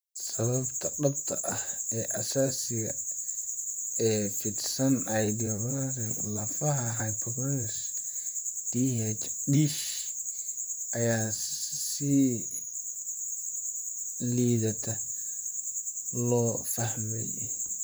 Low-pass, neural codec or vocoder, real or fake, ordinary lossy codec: none; vocoder, 44.1 kHz, 128 mel bands, Pupu-Vocoder; fake; none